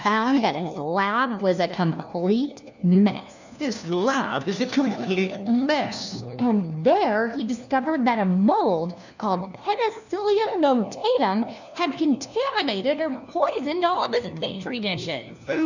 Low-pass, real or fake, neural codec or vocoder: 7.2 kHz; fake; codec, 16 kHz, 1 kbps, FunCodec, trained on LibriTTS, 50 frames a second